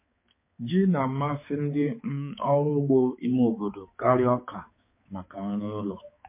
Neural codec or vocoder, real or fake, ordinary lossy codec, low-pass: codec, 16 kHz, 4 kbps, X-Codec, HuBERT features, trained on balanced general audio; fake; MP3, 24 kbps; 3.6 kHz